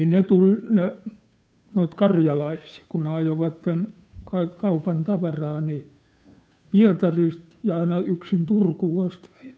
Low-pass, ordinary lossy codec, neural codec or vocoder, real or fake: none; none; codec, 16 kHz, 2 kbps, FunCodec, trained on Chinese and English, 25 frames a second; fake